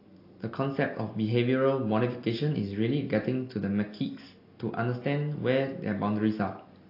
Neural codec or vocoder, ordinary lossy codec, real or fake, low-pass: none; AAC, 32 kbps; real; 5.4 kHz